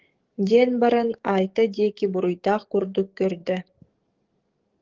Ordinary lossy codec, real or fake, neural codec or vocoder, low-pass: Opus, 16 kbps; fake; codec, 44.1 kHz, 7.8 kbps, DAC; 7.2 kHz